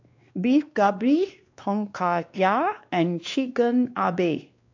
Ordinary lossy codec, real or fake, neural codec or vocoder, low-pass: AAC, 48 kbps; fake; codec, 16 kHz, 2 kbps, X-Codec, WavLM features, trained on Multilingual LibriSpeech; 7.2 kHz